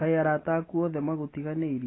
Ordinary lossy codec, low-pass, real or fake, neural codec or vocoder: AAC, 16 kbps; 7.2 kHz; real; none